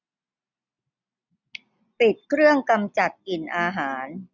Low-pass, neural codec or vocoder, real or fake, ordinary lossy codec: 7.2 kHz; none; real; none